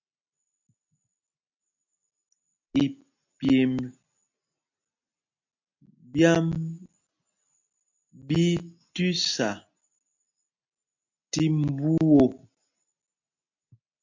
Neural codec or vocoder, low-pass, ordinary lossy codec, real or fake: none; 7.2 kHz; MP3, 48 kbps; real